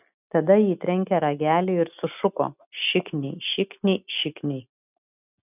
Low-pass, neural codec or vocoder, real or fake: 3.6 kHz; none; real